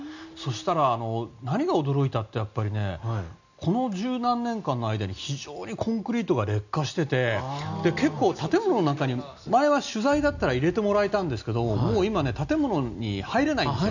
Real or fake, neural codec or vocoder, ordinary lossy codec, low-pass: real; none; none; 7.2 kHz